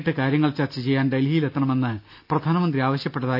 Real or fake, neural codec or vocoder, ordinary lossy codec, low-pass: real; none; none; 5.4 kHz